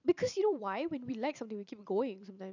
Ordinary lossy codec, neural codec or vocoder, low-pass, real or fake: none; vocoder, 44.1 kHz, 128 mel bands every 512 samples, BigVGAN v2; 7.2 kHz; fake